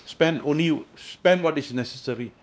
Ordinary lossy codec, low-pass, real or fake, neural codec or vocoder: none; none; fake; codec, 16 kHz, 2 kbps, X-Codec, WavLM features, trained on Multilingual LibriSpeech